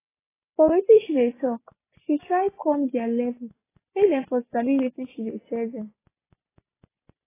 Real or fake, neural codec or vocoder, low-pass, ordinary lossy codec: real; none; 3.6 kHz; AAC, 16 kbps